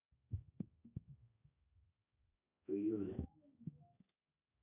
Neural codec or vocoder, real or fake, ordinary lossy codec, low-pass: codec, 16 kHz, 1 kbps, X-Codec, HuBERT features, trained on balanced general audio; fake; none; 3.6 kHz